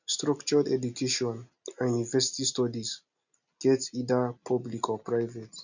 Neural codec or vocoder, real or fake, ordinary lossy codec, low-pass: none; real; none; 7.2 kHz